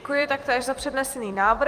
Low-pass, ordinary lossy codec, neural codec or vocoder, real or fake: 14.4 kHz; Opus, 32 kbps; vocoder, 44.1 kHz, 128 mel bands every 256 samples, BigVGAN v2; fake